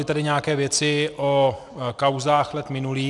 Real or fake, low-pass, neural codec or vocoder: real; 10.8 kHz; none